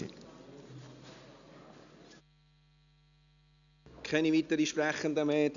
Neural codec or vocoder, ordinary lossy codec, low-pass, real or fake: none; none; 7.2 kHz; real